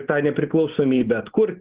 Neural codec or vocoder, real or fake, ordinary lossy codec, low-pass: none; real; Opus, 16 kbps; 3.6 kHz